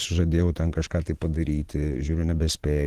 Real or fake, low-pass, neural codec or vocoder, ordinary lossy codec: fake; 14.4 kHz; vocoder, 44.1 kHz, 128 mel bands every 512 samples, BigVGAN v2; Opus, 16 kbps